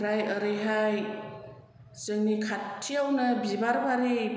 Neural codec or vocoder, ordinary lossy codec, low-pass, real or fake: none; none; none; real